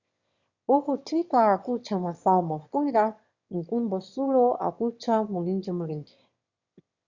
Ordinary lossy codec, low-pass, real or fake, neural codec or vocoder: Opus, 64 kbps; 7.2 kHz; fake; autoencoder, 22.05 kHz, a latent of 192 numbers a frame, VITS, trained on one speaker